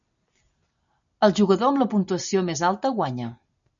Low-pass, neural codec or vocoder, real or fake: 7.2 kHz; none; real